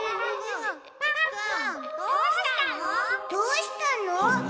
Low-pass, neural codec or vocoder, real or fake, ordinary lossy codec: none; none; real; none